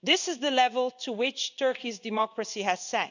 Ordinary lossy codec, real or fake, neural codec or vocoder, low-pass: none; fake; codec, 16 kHz in and 24 kHz out, 1 kbps, XY-Tokenizer; 7.2 kHz